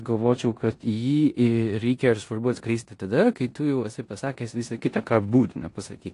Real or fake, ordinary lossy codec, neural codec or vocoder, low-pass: fake; AAC, 48 kbps; codec, 16 kHz in and 24 kHz out, 0.9 kbps, LongCat-Audio-Codec, four codebook decoder; 10.8 kHz